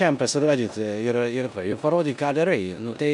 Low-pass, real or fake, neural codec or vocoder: 10.8 kHz; fake; codec, 16 kHz in and 24 kHz out, 0.9 kbps, LongCat-Audio-Codec, four codebook decoder